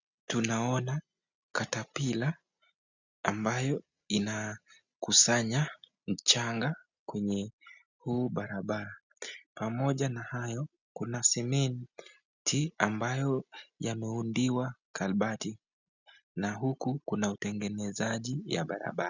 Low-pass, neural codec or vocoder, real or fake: 7.2 kHz; none; real